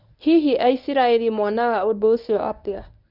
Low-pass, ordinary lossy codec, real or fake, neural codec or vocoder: 5.4 kHz; none; fake; codec, 24 kHz, 0.9 kbps, WavTokenizer, medium speech release version 1